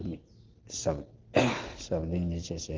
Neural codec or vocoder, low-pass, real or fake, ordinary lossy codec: none; 7.2 kHz; real; Opus, 16 kbps